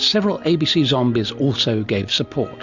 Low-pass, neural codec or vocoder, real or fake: 7.2 kHz; none; real